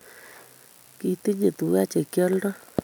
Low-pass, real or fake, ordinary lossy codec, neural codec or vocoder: none; real; none; none